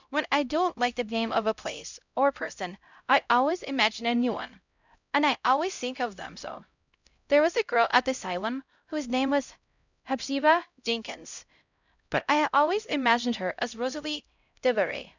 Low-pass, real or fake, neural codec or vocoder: 7.2 kHz; fake; codec, 16 kHz, 0.5 kbps, X-Codec, HuBERT features, trained on LibriSpeech